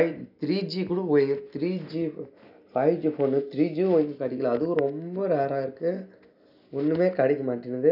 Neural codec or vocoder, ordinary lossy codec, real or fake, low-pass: none; none; real; 5.4 kHz